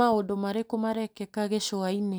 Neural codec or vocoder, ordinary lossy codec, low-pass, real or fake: none; none; none; real